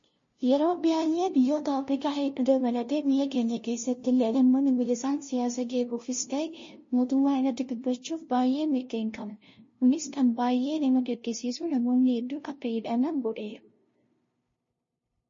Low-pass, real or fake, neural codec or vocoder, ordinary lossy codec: 7.2 kHz; fake; codec, 16 kHz, 0.5 kbps, FunCodec, trained on LibriTTS, 25 frames a second; MP3, 32 kbps